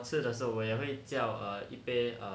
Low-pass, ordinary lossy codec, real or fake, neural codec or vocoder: none; none; real; none